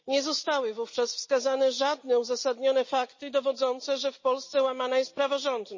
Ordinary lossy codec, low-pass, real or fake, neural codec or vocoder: MP3, 32 kbps; 7.2 kHz; real; none